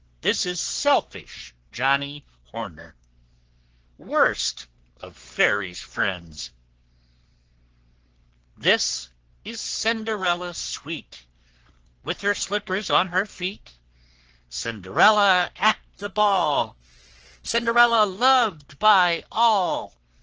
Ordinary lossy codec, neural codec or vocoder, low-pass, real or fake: Opus, 16 kbps; codec, 44.1 kHz, 3.4 kbps, Pupu-Codec; 7.2 kHz; fake